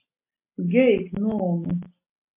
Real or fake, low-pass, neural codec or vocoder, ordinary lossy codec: real; 3.6 kHz; none; MP3, 24 kbps